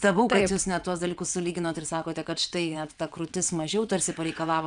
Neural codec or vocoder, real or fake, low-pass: vocoder, 22.05 kHz, 80 mel bands, WaveNeXt; fake; 9.9 kHz